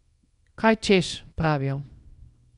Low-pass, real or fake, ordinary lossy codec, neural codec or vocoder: 10.8 kHz; fake; none; codec, 24 kHz, 0.9 kbps, WavTokenizer, small release